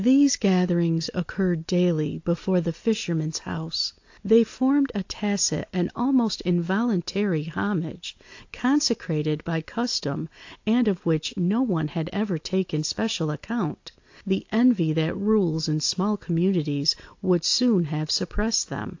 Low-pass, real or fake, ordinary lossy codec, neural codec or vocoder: 7.2 kHz; real; AAC, 48 kbps; none